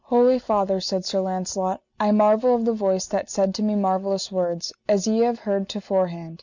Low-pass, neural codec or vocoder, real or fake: 7.2 kHz; none; real